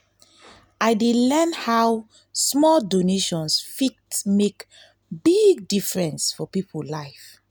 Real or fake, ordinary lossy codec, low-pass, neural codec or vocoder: real; none; none; none